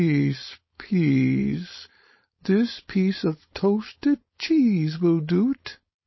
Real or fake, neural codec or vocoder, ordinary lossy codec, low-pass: fake; codec, 16 kHz, 4.8 kbps, FACodec; MP3, 24 kbps; 7.2 kHz